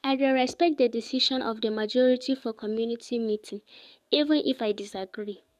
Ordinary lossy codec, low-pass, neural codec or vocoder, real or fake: none; 14.4 kHz; codec, 44.1 kHz, 7.8 kbps, DAC; fake